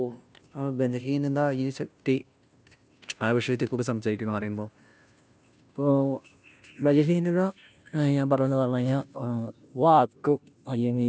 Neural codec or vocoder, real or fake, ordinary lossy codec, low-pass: codec, 16 kHz, 0.5 kbps, FunCodec, trained on Chinese and English, 25 frames a second; fake; none; none